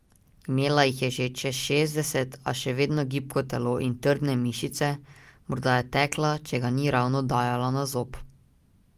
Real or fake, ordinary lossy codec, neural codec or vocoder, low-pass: real; Opus, 32 kbps; none; 14.4 kHz